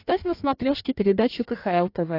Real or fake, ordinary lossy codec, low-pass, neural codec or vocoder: fake; AAC, 32 kbps; 5.4 kHz; codec, 16 kHz in and 24 kHz out, 1.1 kbps, FireRedTTS-2 codec